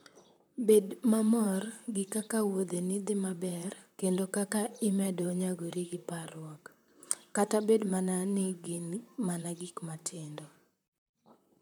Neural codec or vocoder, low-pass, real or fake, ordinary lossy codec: vocoder, 44.1 kHz, 128 mel bands, Pupu-Vocoder; none; fake; none